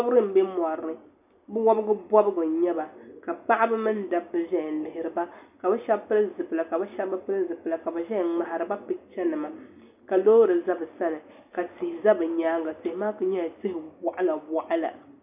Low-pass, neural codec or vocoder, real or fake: 3.6 kHz; none; real